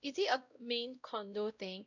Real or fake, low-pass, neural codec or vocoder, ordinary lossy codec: fake; 7.2 kHz; codec, 16 kHz, 0.5 kbps, X-Codec, WavLM features, trained on Multilingual LibriSpeech; none